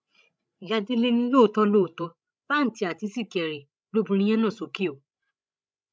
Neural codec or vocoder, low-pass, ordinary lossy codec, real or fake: codec, 16 kHz, 16 kbps, FreqCodec, larger model; none; none; fake